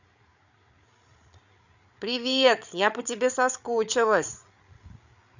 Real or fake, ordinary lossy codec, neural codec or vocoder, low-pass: fake; none; codec, 16 kHz, 8 kbps, FreqCodec, larger model; 7.2 kHz